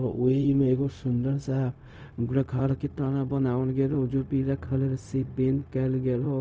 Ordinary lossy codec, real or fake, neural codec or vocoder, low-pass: none; fake; codec, 16 kHz, 0.4 kbps, LongCat-Audio-Codec; none